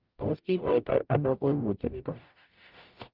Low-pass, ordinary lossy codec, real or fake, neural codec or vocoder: 5.4 kHz; Opus, 24 kbps; fake; codec, 44.1 kHz, 0.9 kbps, DAC